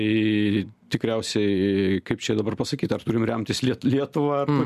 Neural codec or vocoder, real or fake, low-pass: none; real; 14.4 kHz